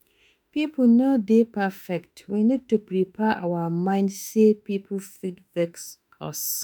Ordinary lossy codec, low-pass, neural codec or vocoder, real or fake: none; none; autoencoder, 48 kHz, 32 numbers a frame, DAC-VAE, trained on Japanese speech; fake